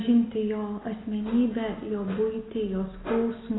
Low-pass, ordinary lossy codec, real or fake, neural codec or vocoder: 7.2 kHz; AAC, 16 kbps; real; none